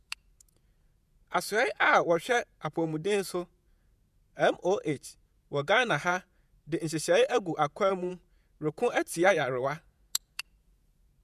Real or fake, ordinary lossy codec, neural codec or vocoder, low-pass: fake; none; vocoder, 48 kHz, 128 mel bands, Vocos; 14.4 kHz